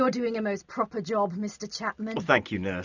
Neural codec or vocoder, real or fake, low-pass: none; real; 7.2 kHz